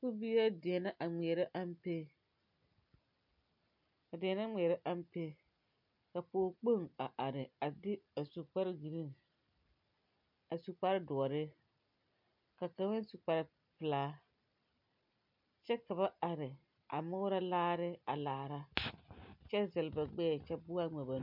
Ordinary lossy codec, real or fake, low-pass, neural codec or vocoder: MP3, 48 kbps; real; 5.4 kHz; none